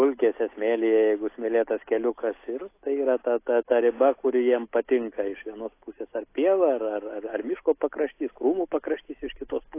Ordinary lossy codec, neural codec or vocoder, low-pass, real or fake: AAC, 24 kbps; none; 3.6 kHz; real